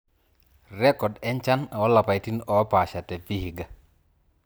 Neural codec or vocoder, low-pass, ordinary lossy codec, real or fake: vocoder, 44.1 kHz, 128 mel bands every 256 samples, BigVGAN v2; none; none; fake